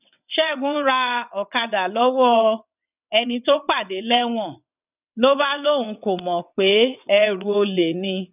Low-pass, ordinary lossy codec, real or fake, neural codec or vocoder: 3.6 kHz; none; fake; vocoder, 22.05 kHz, 80 mel bands, WaveNeXt